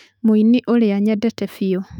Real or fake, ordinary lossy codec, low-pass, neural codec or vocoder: fake; none; 19.8 kHz; autoencoder, 48 kHz, 128 numbers a frame, DAC-VAE, trained on Japanese speech